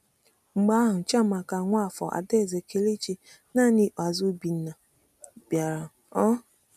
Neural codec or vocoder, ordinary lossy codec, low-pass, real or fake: none; none; 14.4 kHz; real